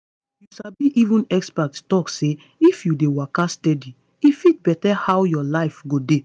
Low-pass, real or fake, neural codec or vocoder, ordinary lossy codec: 9.9 kHz; real; none; none